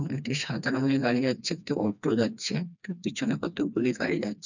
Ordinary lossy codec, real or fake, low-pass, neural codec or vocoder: none; fake; 7.2 kHz; codec, 16 kHz, 2 kbps, FreqCodec, smaller model